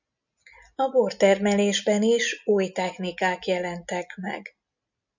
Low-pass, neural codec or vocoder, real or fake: 7.2 kHz; none; real